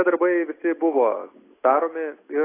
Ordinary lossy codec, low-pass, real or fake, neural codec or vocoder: AAC, 24 kbps; 3.6 kHz; real; none